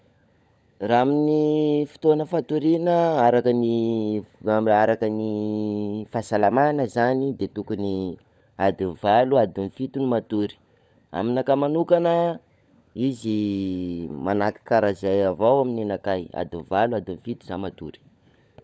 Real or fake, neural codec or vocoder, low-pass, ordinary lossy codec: fake; codec, 16 kHz, 16 kbps, FunCodec, trained on LibriTTS, 50 frames a second; none; none